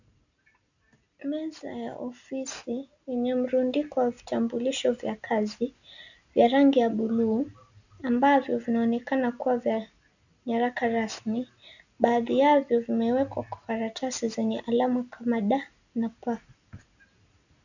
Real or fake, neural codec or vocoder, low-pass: real; none; 7.2 kHz